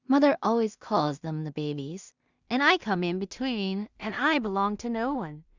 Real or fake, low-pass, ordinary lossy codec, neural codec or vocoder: fake; 7.2 kHz; Opus, 64 kbps; codec, 16 kHz in and 24 kHz out, 0.4 kbps, LongCat-Audio-Codec, two codebook decoder